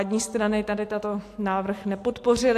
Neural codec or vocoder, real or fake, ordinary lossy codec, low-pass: none; real; AAC, 64 kbps; 14.4 kHz